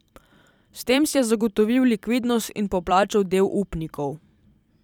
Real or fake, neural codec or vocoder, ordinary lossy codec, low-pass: fake; vocoder, 44.1 kHz, 128 mel bands every 256 samples, BigVGAN v2; none; 19.8 kHz